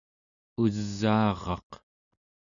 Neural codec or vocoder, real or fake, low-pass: none; real; 7.2 kHz